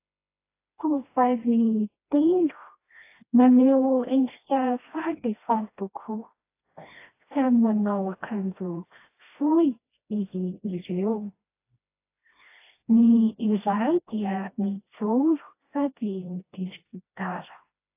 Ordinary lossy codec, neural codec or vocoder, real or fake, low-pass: AAC, 24 kbps; codec, 16 kHz, 1 kbps, FreqCodec, smaller model; fake; 3.6 kHz